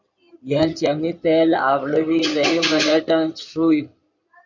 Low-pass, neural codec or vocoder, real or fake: 7.2 kHz; codec, 16 kHz in and 24 kHz out, 2.2 kbps, FireRedTTS-2 codec; fake